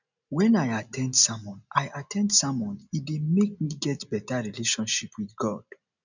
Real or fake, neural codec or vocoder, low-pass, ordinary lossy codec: real; none; 7.2 kHz; none